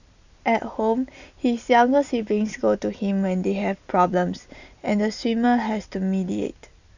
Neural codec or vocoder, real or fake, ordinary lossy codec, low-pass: none; real; none; 7.2 kHz